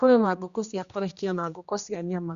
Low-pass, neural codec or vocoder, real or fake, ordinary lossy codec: 7.2 kHz; codec, 16 kHz, 1 kbps, X-Codec, HuBERT features, trained on general audio; fake; Opus, 64 kbps